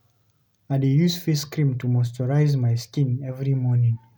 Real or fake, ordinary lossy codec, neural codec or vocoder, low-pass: real; none; none; none